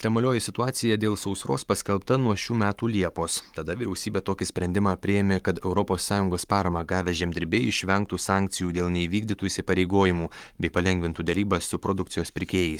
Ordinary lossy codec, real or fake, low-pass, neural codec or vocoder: Opus, 32 kbps; fake; 19.8 kHz; codec, 44.1 kHz, 7.8 kbps, Pupu-Codec